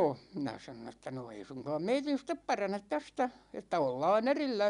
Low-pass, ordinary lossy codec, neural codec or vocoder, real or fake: 10.8 kHz; none; none; real